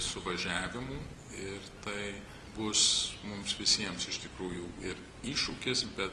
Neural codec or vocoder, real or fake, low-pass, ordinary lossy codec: none; real; 10.8 kHz; Opus, 24 kbps